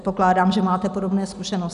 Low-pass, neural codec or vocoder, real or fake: 10.8 kHz; none; real